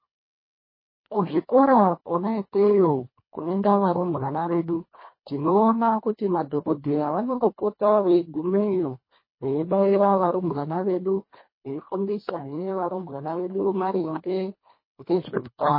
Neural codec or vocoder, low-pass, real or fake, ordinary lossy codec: codec, 24 kHz, 1.5 kbps, HILCodec; 5.4 kHz; fake; MP3, 24 kbps